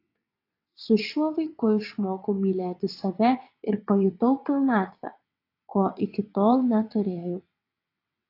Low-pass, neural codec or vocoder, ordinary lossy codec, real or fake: 5.4 kHz; vocoder, 22.05 kHz, 80 mel bands, WaveNeXt; AAC, 32 kbps; fake